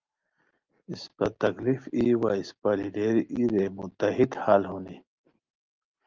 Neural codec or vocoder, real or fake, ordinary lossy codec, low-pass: none; real; Opus, 32 kbps; 7.2 kHz